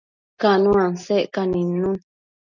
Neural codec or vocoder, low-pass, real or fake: none; 7.2 kHz; real